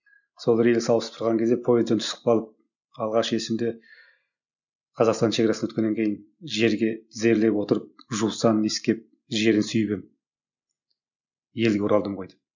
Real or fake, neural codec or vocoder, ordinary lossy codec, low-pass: real; none; none; 7.2 kHz